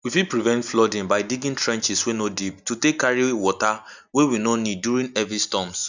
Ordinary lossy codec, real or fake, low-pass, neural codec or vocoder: none; real; 7.2 kHz; none